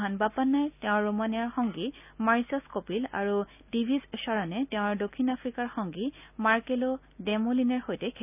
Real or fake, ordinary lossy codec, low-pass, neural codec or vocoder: real; none; 3.6 kHz; none